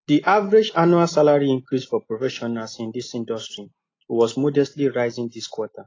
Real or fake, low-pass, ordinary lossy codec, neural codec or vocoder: real; 7.2 kHz; AAC, 32 kbps; none